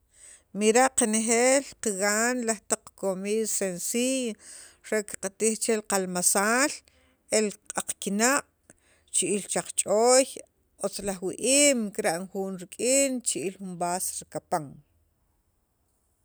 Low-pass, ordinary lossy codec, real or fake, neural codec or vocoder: none; none; real; none